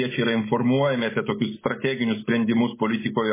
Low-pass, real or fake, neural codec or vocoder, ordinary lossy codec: 3.6 kHz; real; none; MP3, 16 kbps